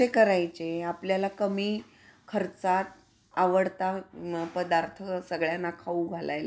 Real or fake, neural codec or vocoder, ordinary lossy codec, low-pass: real; none; none; none